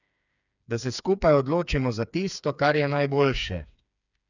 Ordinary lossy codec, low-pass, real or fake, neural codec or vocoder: none; 7.2 kHz; fake; codec, 16 kHz, 4 kbps, FreqCodec, smaller model